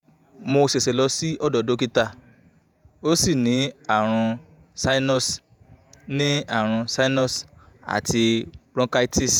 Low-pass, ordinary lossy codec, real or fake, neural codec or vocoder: none; none; fake; vocoder, 48 kHz, 128 mel bands, Vocos